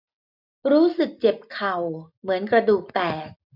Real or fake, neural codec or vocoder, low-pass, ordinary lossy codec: real; none; 5.4 kHz; none